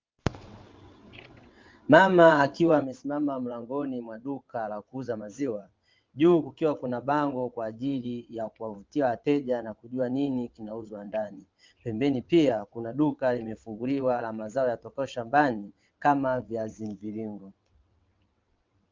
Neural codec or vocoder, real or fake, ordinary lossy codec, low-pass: vocoder, 22.05 kHz, 80 mel bands, WaveNeXt; fake; Opus, 24 kbps; 7.2 kHz